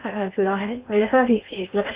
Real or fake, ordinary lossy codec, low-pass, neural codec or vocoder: fake; Opus, 64 kbps; 3.6 kHz; codec, 16 kHz in and 24 kHz out, 0.6 kbps, FocalCodec, streaming, 2048 codes